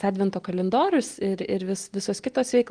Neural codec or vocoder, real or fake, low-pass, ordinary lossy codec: none; real; 9.9 kHz; Opus, 24 kbps